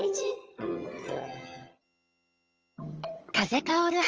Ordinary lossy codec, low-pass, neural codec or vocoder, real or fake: Opus, 24 kbps; 7.2 kHz; vocoder, 22.05 kHz, 80 mel bands, HiFi-GAN; fake